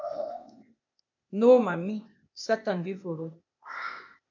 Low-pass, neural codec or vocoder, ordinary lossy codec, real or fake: 7.2 kHz; codec, 16 kHz, 0.8 kbps, ZipCodec; MP3, 48 kbps; fake